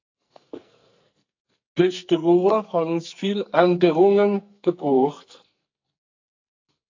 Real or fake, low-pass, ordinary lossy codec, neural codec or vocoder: fake; 7.2 kHz; AAC, 48 kbps; codec, 44.1 kHz, 2.6 kbps, SNAC